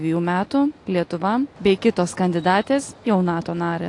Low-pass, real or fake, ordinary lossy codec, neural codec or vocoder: 10.8 kHz; real; AAC, 48 kbps; none